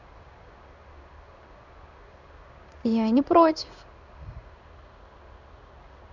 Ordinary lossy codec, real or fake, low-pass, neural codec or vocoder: none; fake; 7.2 kHz; codec, 16 kHz in and 24 kHz out, 1 kbps, XY-Tokenizer